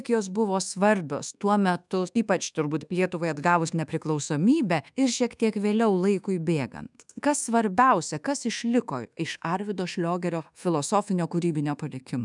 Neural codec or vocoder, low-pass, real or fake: codec, 24 kHz, 1.2 kbps, DualCodec; 10.8 kHz; fake